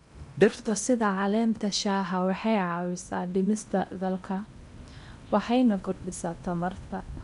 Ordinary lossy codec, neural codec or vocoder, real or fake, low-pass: none; codec, 16 kHz in and 24 kHz out, 0.8 kbps, FocalCodec, streaming, 65536 codes; fake; 10.8 kHz